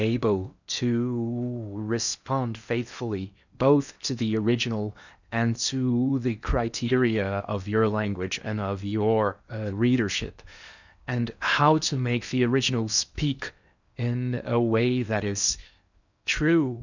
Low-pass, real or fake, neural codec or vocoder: 7.2 kHz; fake; codec, 16 kHz in and 24 kHz out, 0.8 kbps, FocalCodec, streaming, 65536 codes